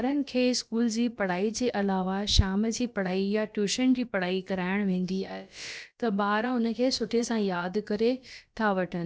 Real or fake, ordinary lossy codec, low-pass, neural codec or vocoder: fake; none; none; codec, 16 kHz, about 1 kbps, DyCAST, with the encoder's durations